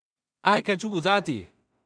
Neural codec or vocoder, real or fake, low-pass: codec, 16 kHz in and 24 kHz out, 0.4 kbps, LongCat-Audio-Codec, two codebook decoder; fake; 9.9 kHz